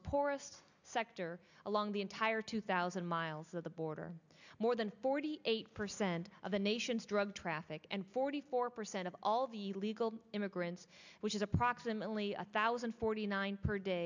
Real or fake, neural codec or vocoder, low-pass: real; none; 7.2 kHz